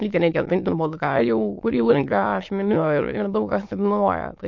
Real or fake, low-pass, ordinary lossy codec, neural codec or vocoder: fake; 7.2 kHz; MP3, 48 kbps; autoencoder, 22.05 kHz, a latent of 192 numbers a frame, VITS, trained on many speakers